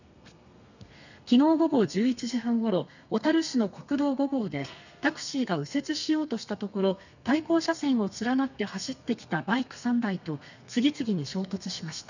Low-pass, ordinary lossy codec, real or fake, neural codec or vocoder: 7.2 kHz; none; fake; codec, 32 kHz, 1.9 kbps, SNAC